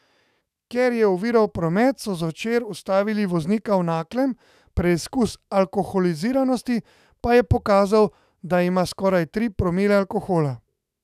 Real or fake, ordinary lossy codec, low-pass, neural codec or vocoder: fake; none; 14.4 kHz; autoencoder, 48 kHz, 128 numbers a frame, DAC-VAE, trained on Japanese speech